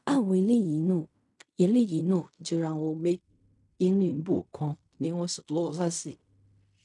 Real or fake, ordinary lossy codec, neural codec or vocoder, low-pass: fake; none; codec, 16 kHz in and 24 kHz out, 0.4 kbps, LongCat-Audio-Codec, fine tuned four codebook decoder; 10.8 kHz